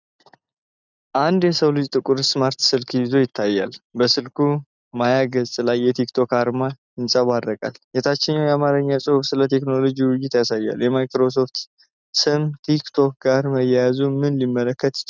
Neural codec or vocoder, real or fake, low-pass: none; real; 7.2 kHz